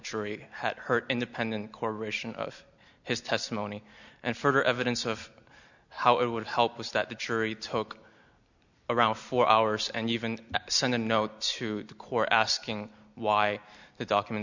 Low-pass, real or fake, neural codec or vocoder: 7.2 kHz; real; none